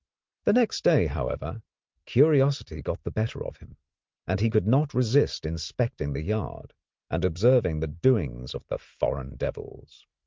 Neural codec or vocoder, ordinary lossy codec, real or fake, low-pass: none; Opus, 24 kbps; real; 7.2 kHz